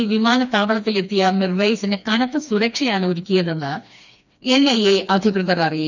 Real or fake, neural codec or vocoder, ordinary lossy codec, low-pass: fake; codec, 16 kHz, 2 kbps, FreqCodec, smaller model; none; 7.2 kHz